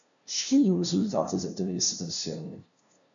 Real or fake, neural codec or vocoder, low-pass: fake; codec, 16 kHz, 0.5 kbps, FunCodec, trained on LibriTTS, 25 frames a second; 7.2 kHz